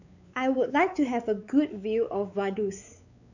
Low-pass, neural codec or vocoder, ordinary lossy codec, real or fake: 7.2 kHz; codec, 16 kHz, 4 kbps, X-Codec, WavLM features, trained on Multilingual LibriSpeech; none; fake